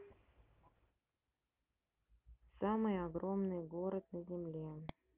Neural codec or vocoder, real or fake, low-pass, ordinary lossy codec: none; real; 3.6 kHz; Opus, 24 kbps